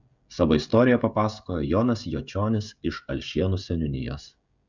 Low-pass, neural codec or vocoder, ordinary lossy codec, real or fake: 7.2 kHz; codec, 16 kHz, 16 kbps, FreqCodec, smaller model; Opus, 64 kbps; fake